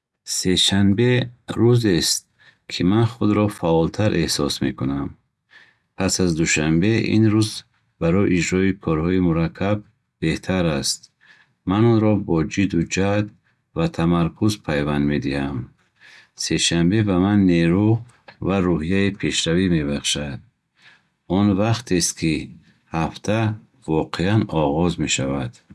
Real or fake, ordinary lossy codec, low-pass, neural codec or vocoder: real; none; none; none